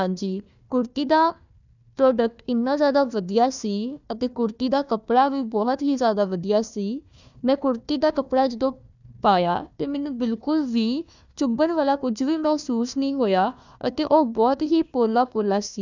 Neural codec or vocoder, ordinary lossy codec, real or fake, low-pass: codec, 16 kHz, 1 kbps, FunCodec, trained on Chinese and English, 50 frames a second; none; fake; 7.2 kHz